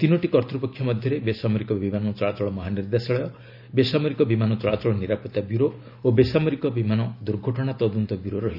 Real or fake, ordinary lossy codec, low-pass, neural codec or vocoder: real; none; 5.4 kHz; none